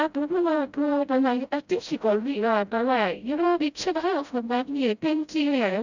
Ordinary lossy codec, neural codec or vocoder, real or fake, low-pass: none; codec, 16 kHz, 0.5 kbps, FreqCodec, smaller model; fake; 7.2 kHz